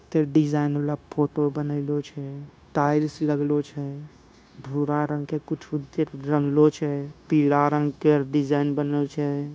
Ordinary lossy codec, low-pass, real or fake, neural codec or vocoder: none; none; fake; codec, 16 kHz, 0.9 kbps, LongCat-Audio-Codec